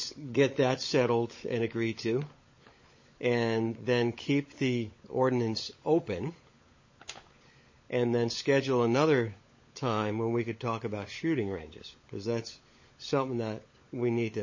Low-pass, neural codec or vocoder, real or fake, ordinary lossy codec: 7.2 kHz; codec, 16 kHz, 16 kbps, FunCodec, trained on LibriTTS, 50 frames a second; fake; MP3, 32 kbps